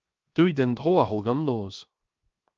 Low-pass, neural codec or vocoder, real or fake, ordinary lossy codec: 7.2 kHz; codec, 16 kHz, 0.7 kbps, FocalCodec; fake; Opus, 24 kbps